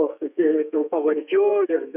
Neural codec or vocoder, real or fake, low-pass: codec, 32 kHz, 1.9 kbps, SNAC; fake; 3.6 kHz